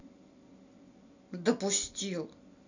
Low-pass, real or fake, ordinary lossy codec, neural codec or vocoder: 7.2 kHz; real; none; none